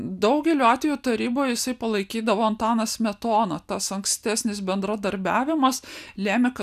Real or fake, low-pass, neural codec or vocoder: real; 14.4 kHz; none